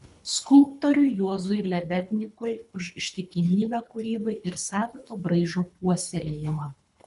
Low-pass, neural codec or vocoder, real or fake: 10.8 kHz; codec, 24 kHz, 3 kbps, HILCodec; fake